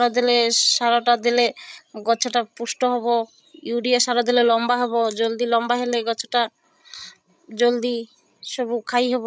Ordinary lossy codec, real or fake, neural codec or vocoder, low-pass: none; fake; codec, 16 kHz, 16 kbps, FreqCodec, larger model; none